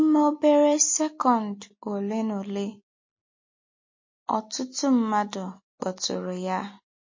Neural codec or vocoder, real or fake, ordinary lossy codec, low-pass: none; real; MP3, 32 kbps; 7.2 kHz